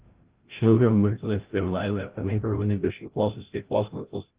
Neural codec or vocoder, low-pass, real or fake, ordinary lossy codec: codec, 16 kHz, 0.5 kbps, FreqCodec, larger model; 3.6 kHz; fake; Opus, 16 kbps